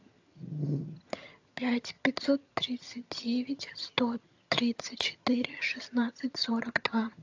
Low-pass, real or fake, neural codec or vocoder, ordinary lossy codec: 7.2 kHz; fake; vocoder, 22.05 kHz, 80 mel bands, HiFi-GAN; AAC, 48 kbps